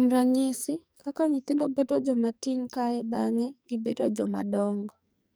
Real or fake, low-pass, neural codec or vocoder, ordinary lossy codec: fake; none; codec, 44.1 kHz, 2.6 kbps, SNAC; none